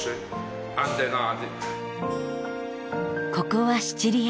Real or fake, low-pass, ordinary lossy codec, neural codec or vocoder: real; none; none; none